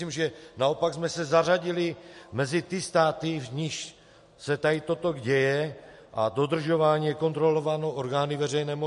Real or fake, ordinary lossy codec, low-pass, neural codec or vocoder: real; MP3, 48 kbps; 14.4 kHz; none